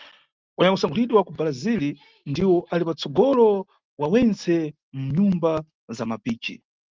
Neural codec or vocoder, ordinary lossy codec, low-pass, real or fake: none; Opus, 32 kbps; 7.2 kHz; real